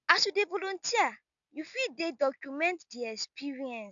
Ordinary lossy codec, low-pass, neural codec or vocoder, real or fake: none; 7.2 kHz; none; real